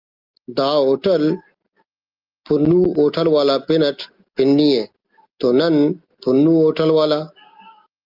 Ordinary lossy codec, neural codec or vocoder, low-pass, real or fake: Opus, 24 kbps; none; 5.4 kHz; real